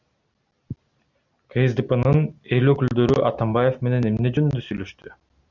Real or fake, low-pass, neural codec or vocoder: real; 7.2 kHz; none